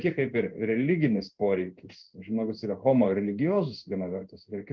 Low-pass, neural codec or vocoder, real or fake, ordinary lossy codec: 7.2 kHz; codec, 16 kHz in and 24 kHz out, 1 kbps, XY-Tokenizer; fake; Opus, 32 kbps